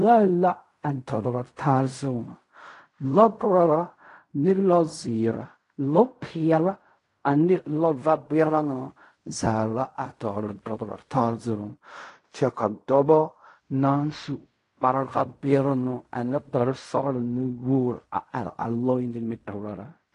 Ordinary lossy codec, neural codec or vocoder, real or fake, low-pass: AAC, 48 kbps; codec, 16 kHz in and 24 kHz out, 0.4 kbps, LongCat-Audio-Codec, fine tuned four codebook decoder; fake; 10.8 kHz